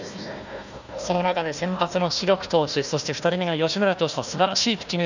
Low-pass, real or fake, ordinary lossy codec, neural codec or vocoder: 7.2 kHz; fake; none; codec, 16 kHz, 1 kbps, FunCodec, trained on Chinese and English, 50 frames a second